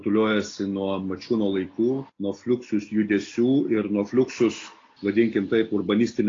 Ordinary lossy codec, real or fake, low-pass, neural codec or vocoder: AAC, 32 kbps; real; 7.2 kHz; none